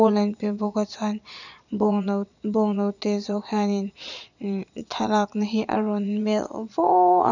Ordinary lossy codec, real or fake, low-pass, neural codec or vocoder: none; fake; 7.2 kHz; vocoder, 22.05 kHz, 80 mel bands, Vocos